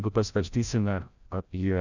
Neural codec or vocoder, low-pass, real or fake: codec, 16 kHz, 0.5 kbps, FreqCodec, larger model; 7.2 kHz; fake